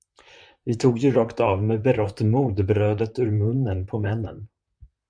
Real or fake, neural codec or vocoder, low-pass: fake; vocoder, 44.1 kHz, 128 mel bands, Pupu-Vocoder; 9.9 kHz